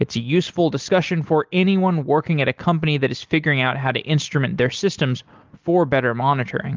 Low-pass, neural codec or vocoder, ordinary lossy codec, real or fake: 7.2 kHz; none; Opus, 32 kbps; real